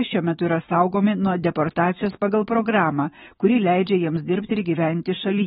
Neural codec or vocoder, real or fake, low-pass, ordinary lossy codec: autoencoder, 48 kHz, 128 numbers a frame, DAC-VAE, trained on Japanese speech; fake; 19.8 kHz; AAC, 16 kbps